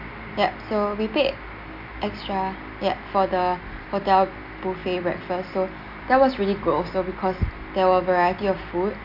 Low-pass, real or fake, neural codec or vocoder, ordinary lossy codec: 5.4 kHz; real; none; none